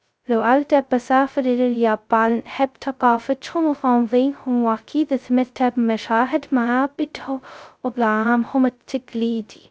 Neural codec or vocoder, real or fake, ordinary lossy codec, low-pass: codec, 16 kHz, 0.2 kbps, FocalCodec; fake; none; none